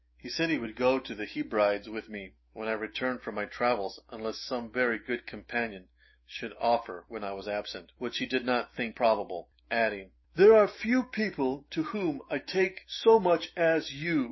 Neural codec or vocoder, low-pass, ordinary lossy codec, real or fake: autoencoder, 48 kHz, 128 numbers a frame, DAC-VAE, trained on Japanese speech; 7.2 kHz; MP3, 24 kbps; fake